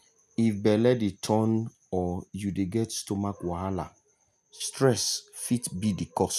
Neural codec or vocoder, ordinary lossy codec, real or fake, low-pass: none; none; real; 14.4 kHz